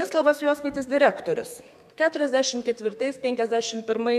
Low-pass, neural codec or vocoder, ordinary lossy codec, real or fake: 14.4 kHz; codec, 32 kHz, 1.9 kbps, SNAC; MP3, 96 kbps; fake